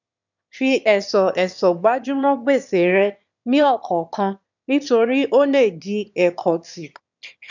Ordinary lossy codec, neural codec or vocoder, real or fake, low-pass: none; autoencoder, 22.05 kHz, a latent of 192 numbers a frame, VITS, trained on one speaker; fake; 7.2 kHz